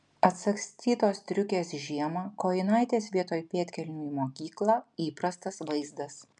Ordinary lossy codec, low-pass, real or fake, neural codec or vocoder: MP3, 96 kbps; 10.8 kHz; real; none